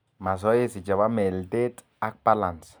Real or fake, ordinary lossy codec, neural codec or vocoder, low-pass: real; none; none; none